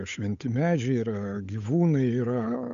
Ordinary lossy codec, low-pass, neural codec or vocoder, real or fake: AAC, 96 kbps; 7.2 kHz; codec, 16 kHz, 8 kbps, FunCodec, trained on Chinese and English, 25 frames a second; fake